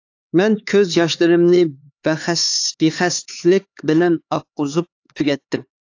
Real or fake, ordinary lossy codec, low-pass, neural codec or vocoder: fake; AAC, 48 kbps; 7.2 kHz; codec, 16 kHz, 4 kbps, X-Codec, HuBERT features, trained on LibriSpeech